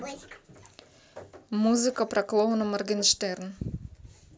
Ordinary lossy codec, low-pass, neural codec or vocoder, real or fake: none; none; none; real